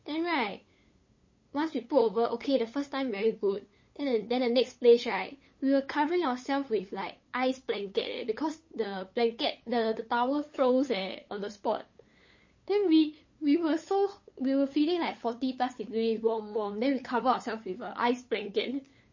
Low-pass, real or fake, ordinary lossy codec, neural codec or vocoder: 7.2 kHz; fake; MP3, 32 kbps; codec, 16 kHz, 8 kbps, FunCodec, trained on LibriTTS, 25 frames a second